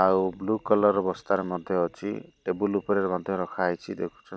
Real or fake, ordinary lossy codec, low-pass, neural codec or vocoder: real; none; none; none